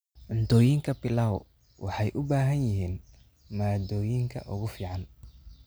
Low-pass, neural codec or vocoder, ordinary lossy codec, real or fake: none; none; none; real